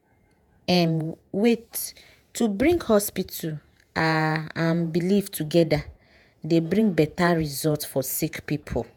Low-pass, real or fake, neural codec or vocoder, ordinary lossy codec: none; fake; vocoder, 48 kHz, 128 mel bands, Vocos; none